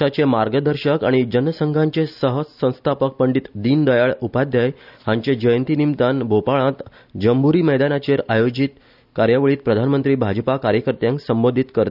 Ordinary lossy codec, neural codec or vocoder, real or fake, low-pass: none; none; real; 5.4 kHz